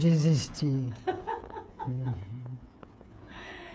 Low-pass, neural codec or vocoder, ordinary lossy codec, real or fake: none; codec, 16 kHz, 8 kbps, FreqCodec, smaller model; none; fake